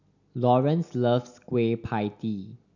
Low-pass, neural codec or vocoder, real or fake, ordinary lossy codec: 7.2 kHz; none; real; none